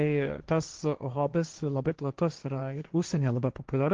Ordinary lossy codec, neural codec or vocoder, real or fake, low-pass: Opus, 16 kbps; codec, 16 kHz, 1.1 kbps, Voila-Tokenizer; fake; 7.2 kHz